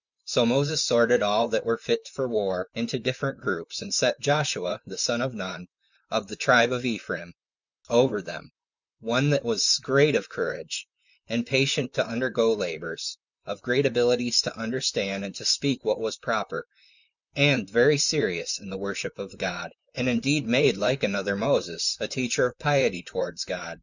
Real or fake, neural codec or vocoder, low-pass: fake; vocoder, 44.1 kHz, 128 mel bands, Pupu-Vocoder; 7.2 kHz